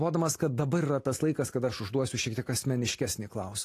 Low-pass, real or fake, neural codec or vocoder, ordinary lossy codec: 14.4 kHz; real; none; AAC, 48 kbps